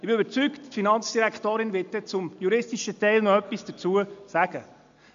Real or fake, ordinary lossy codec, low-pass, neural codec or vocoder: real; AAC, 64 kbps; 7.2 kHz; none